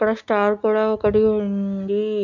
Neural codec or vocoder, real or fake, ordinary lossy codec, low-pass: none; real; MP3, 64 kbps; 7.2 kHz